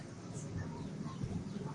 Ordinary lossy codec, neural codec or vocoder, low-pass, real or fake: MP3, 64 kbps; vocoder, 44.1 kHz, 128 mel bands, Pupu-Vocoder; 10.8 kHz; fake